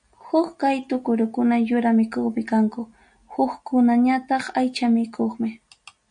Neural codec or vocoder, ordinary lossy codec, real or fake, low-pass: none; MP3, 64 kbps; real; 9.9 kHz